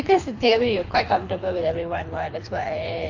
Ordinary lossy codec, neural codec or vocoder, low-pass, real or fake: AAC, 48 kbps; codec, 24 kHz, 3 kbps, HILCodec; 7.2 kHz; fake